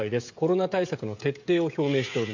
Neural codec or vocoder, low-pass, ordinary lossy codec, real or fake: codec, 16 kHz, 16 kbps, FreqCodec, smaller model; 7.2 kHz; MP3, 64 kbps; fake